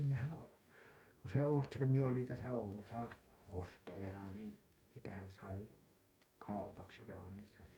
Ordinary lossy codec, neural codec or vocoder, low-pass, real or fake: none; codec, 44.1 kHz, 2.6 kbps, DAC; 19.8 kHz; fake